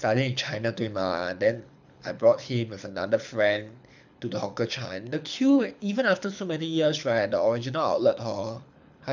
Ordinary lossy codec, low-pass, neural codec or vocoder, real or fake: none; 7.2 kHz; codec, 24 kHz, 6 kbps, HILCodec; fake